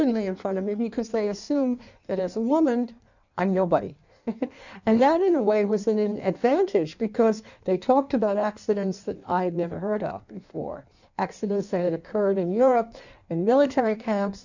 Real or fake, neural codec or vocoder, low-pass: fake; codec, 16 kHz in and 24 kHz out, 1.1 kbps, FireRedTTS-2 codec; 7.2 kHz